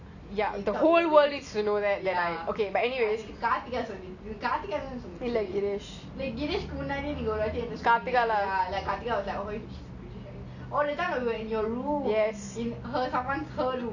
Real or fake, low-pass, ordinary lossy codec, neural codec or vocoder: real; 7.2 kHz; none; none